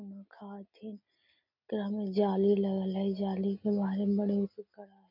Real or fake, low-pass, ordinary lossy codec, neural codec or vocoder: real; 5.4 kHz; none; none